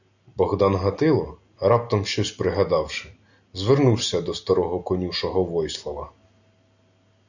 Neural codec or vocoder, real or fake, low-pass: none; real; 7.2 kHz